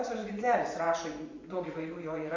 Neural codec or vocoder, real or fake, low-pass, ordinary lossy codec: vocoder, 24 kHz, 100 mel bands, Vocos; fake; 7.2 kHz; MP3, 64 kbps